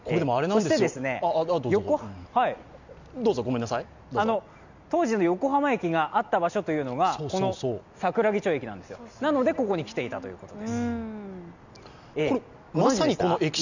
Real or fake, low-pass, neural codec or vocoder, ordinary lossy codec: real; 7.2 kHz; none; none